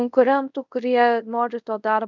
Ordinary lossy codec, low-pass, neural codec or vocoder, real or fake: MP3, 64 kbps; 7.2 kHz; codec, 24 kHz, 0.5 kbps, DualCodec; fake